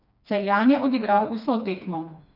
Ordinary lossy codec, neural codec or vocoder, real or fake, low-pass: none; codec, 16 kHz, 2 kbps, FreqCodec, smaller model; fake; 5.4 kHz